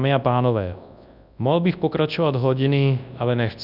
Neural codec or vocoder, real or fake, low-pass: codec, 24 kHz, 0.9 kbps, WavTokenizer, large speech release; fake; 5.4 kHz